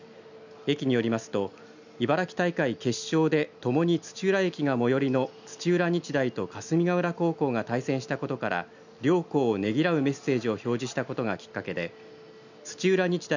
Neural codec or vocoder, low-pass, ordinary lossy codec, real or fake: none; 7.2 kHz; none; real